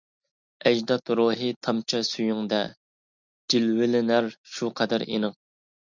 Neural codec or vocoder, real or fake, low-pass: none; real; 7.2 kHz